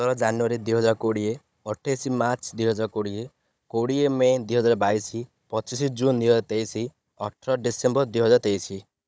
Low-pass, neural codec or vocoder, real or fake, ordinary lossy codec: none; codec, 16 kHz, 16 kbps, FunCodec, trained on Chinese and English, 50 frames a second; fake; none